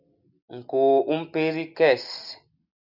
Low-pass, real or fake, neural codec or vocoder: 5.4 kHz; real; none